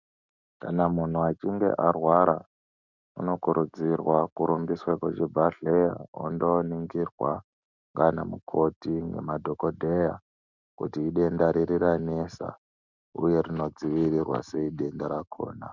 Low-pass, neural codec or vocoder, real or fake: 7.2 kHz; none; real